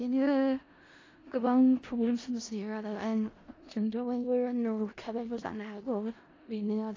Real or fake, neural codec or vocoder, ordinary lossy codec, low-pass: fake; codec, 16 kHz in and 24 kHz out, 0.4 kbps, LongCat-Audio-Codec, four codebook decoder; AAC, 32 kbps; 7.2 kHz